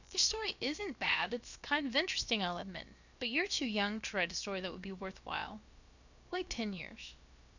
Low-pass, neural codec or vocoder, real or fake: 7.2 kHz; codec, 16 kHz, about 1 kbps, DyCAST, with the encoder's durations; fake